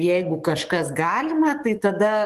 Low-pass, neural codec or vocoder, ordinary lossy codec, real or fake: 14.4 kHz; codec, 44.1 kHz, 7.8 kbps, DAC; Opus, 24 kbps; fake